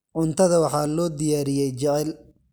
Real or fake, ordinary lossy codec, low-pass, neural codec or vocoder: real; none; none; none